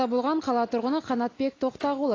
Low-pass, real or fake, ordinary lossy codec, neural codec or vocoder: 7.2 kHz; real; AAC, 32 kbps; none